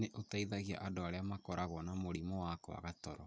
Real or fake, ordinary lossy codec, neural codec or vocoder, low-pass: real; none; none; none